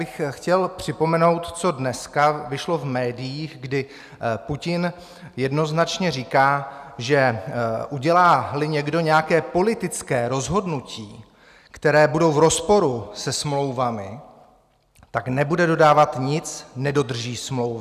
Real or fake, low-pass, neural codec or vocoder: real; 14.4 kHz; none